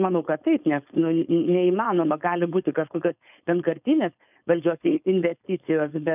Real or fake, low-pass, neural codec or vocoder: fake; 3.6 kHz; codec, 16 kHz, 4.8 kbps, FACodec